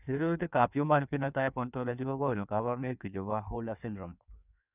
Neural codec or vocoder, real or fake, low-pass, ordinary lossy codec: codec, 16 kHz in and 24 kHz out, 1.1 kbps, FireRedTTS-2 codec; fake; 3.6 kHz; none